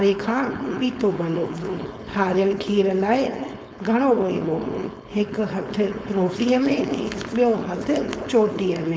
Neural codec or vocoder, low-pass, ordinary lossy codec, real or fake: codec, 16 kHz, 4.8 kbps, FACodec; none; none; fake